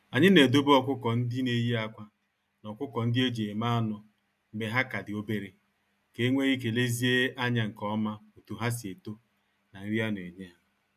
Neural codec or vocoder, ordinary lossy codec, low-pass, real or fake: none; none; 14.4 kHz; real